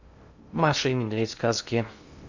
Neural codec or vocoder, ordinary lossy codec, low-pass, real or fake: codec, 16 kHz in and 24 kHz out, 0.6 kbps, FocalCodec, streaming, 2048 codes; Opus, 64 kbps; 7.2 kHz; fake